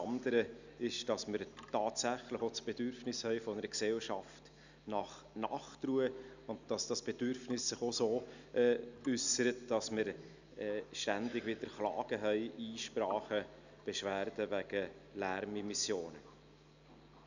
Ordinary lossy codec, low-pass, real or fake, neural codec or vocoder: none; 7.2 kHz; real; none